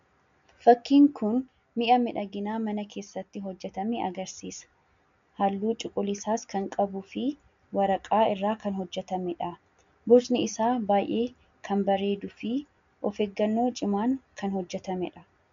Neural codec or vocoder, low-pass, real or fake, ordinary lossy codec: none; 7.2 kHz; real; MP3, 64 kbps